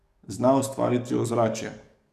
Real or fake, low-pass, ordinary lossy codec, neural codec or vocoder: fake; 14.4 kHz; none; codec, 44.1 kHz, 7.8 kbps, DAC